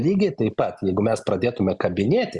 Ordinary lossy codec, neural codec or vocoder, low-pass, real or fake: Opus, 64 kbps; none; 10.8 kHz; real